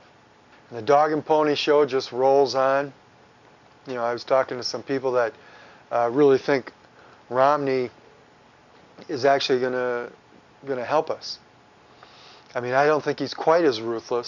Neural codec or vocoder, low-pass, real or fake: none; 7.2 kHz; real